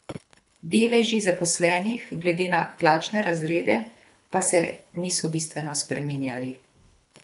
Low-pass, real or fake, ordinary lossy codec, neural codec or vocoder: 10.8 kHz; fake; none; codec, 24 kHz, 3 kbps, HILCodec